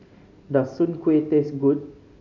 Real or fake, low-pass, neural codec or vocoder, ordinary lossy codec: real; 7.2 kHz; none; none